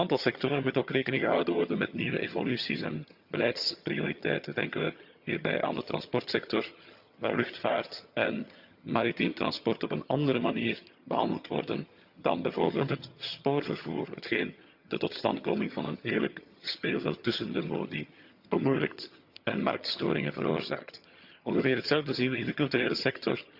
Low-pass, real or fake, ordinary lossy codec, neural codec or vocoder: 5.4 kHz; fake; Opus, 64 kbps; vocoder, 22.05 kHz, 80 mel bands, HiFi-GAN